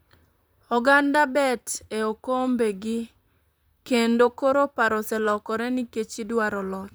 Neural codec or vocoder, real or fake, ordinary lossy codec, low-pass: vocoder, 44.1 kHz, 128 mel bands, Pupu-Vocoder; fake; none; none